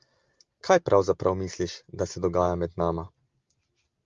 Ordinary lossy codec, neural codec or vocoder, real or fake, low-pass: Opus, 32 kbps; none; real; 7.2 kHz